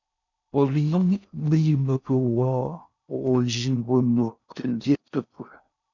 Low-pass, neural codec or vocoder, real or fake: 7.2 kHz; codec, 16 kHz in and 24 kHz out, 0.6 kbps, FocalCodec, streaming, 4096 codes; fake